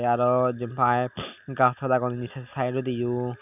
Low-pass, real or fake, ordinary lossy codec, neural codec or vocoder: 3.6 kHz; real; none; none